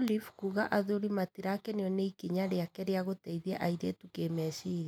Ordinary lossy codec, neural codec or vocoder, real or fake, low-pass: none; none; real; 19.8 kHz